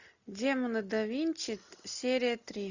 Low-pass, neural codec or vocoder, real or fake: 7.2 kHz; none; real